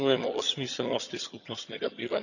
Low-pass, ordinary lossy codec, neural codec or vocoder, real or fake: 7.2 kHz; none; vocoder, 22.05 kHz, 80 mel bands, HiFi-GAN; fake